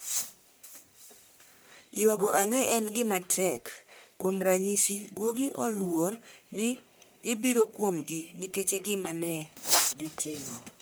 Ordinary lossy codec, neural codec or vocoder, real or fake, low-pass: none; codec, 44.1 kHz, 1.7 kbps, Pupu-Codec; fake; none